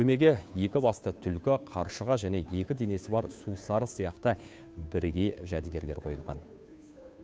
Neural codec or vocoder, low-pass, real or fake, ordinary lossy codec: codec, 16 kHz, 2 kbps, FunCodec, trained on Chinese and English, 25 frames a second; none; fake; none